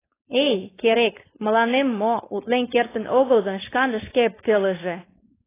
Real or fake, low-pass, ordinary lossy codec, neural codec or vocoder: fake; 3.6 kHz; AAC, 16 kbps; codec, 16 kHz, 4.8 kbps, FACodec